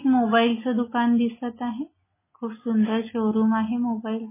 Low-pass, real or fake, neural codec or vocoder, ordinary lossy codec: 3.6 kHz; real; none; MP3, 16 kbps